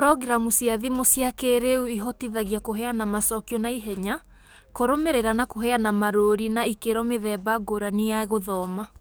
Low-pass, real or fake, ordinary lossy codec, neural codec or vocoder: none; fake; none; codec, 44.1 kHz, 7.8 kbps, DAC